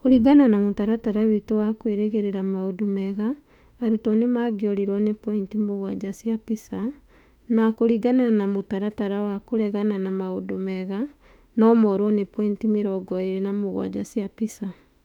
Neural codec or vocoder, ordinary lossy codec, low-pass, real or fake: autoencoder, 48 kHz, 32 numbers a frame, DAC-VAE, trained on Japanese speech; none; 19.8 kHz; fake